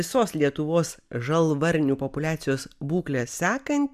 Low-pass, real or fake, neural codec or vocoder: 14.4 kHz; real; none